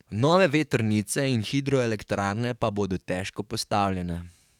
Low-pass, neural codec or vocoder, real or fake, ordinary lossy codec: 19.8 kHz; codec, 44.1 kHz, 7.8 kbps, DAC; fake; none